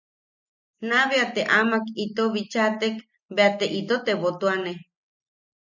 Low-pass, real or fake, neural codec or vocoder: 7.2 kHz; real; none